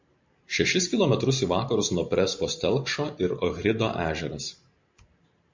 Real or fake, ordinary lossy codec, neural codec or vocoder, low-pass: fake; MP3, 64 kbps; vocoder, 44.1 kHz, 128 mel bands every 512 samples, BigVGAN v2; 7.2 kHz